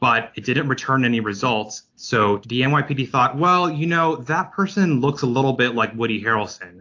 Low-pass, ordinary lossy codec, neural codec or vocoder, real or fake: 7.2 kHz; AAC, 48 kbps; none; real